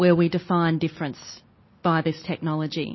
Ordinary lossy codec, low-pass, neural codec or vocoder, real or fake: MP3, 24 kbps; 7.2 kHz; none; real